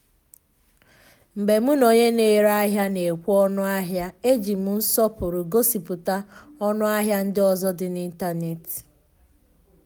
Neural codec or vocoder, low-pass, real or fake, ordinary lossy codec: none; none; real; none